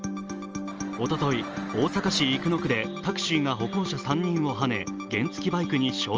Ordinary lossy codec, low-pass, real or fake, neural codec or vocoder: Opus, 24 kbps; 7.2 kHz; real; none